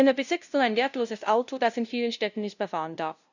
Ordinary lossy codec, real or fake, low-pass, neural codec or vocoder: none; fake; 7.2 kHz; codec, 16 kHz, 0.5 kbps, FunCodec, trained on LibriTTS, 25 frames a second